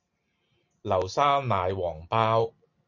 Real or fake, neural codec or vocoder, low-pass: real; none; 7.2 kHz